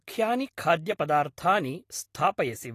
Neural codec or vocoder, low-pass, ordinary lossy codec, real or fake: none; 14.4 kHz; AAC, 48 kbps; real